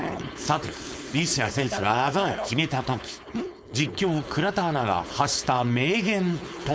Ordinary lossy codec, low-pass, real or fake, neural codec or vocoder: none; none; fake; codec, 16 kHz, 4.8 kbps, FACodec